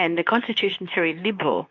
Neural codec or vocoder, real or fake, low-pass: codec, 24 kHz, 0.9 kbps, WavTokenizer, medium speech release version 2; fake; 7.2 kHz